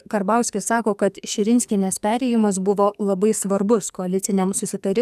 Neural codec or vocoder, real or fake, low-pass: codec, 44.1 kHz, 2.6 kbps, SNAC; fake; 14.4 kHz